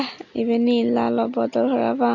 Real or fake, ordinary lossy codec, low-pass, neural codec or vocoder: real; none; 7.2 kHz; none